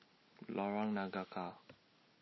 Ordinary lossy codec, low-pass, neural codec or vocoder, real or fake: MP3, 24 kbps; 7.2 kHz; none; real